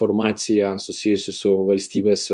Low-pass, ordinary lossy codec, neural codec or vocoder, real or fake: 10.8 kHz; MP3, 96 kbps; codec, 24 kHz, 0.9 kbps, WavTokenizer, medium speech release version 2; fake